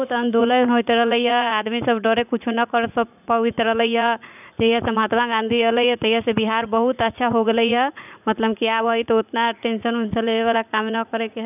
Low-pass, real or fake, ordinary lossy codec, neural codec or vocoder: 3.6 kHz; fake; none; vocoder, 44.1 kHz, 80 mel bands, Vocos